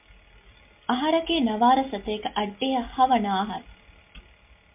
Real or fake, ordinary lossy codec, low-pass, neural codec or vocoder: real; MP3, 32 kbps; 3.6 kHz; none